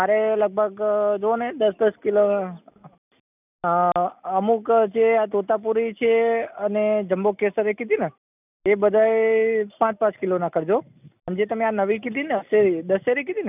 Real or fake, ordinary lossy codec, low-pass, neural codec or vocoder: real; none; 3.6 kHz; none